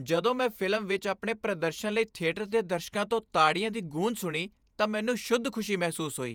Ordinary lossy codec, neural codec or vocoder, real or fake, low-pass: none; vocoder, 48 kHz, 128 mel bands, Vocos; fake; 19.8 kHz